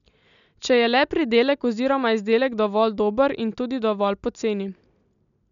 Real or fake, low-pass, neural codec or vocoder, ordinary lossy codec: real; 7.2 kHz; none; none